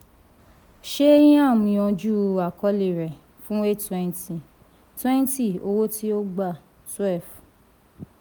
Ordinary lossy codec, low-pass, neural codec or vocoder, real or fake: none; none; none; real